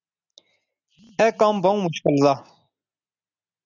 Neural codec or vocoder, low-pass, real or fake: none; 7.2 kHz; real